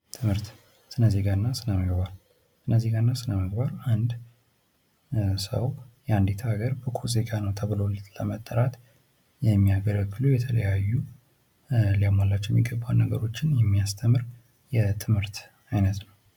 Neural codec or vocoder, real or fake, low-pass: none; real; 19.8 kHz